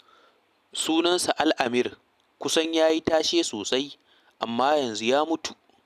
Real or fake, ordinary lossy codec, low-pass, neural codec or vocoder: real; none; 14.4 kHz; none